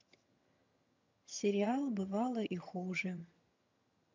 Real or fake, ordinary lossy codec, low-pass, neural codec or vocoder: fake; MP3, 64 kbps; 7.2 kHz; vocoder, 22.05 kHz, 80 mel bands, HiFi-GAN